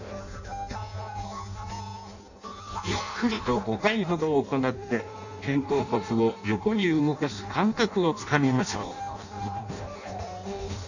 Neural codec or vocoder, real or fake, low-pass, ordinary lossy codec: codec, 16 kHz in and 24 kHz out, 0.6 kbps, FireRedTTS-2 codec; fake; 7.2 kHz; none